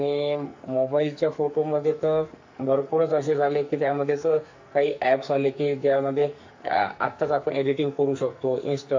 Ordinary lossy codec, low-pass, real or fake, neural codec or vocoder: MP3, 48 kbps; 7.2 kHz; fake; codec, 44.1 kHz, 2.6 kbps, SNAC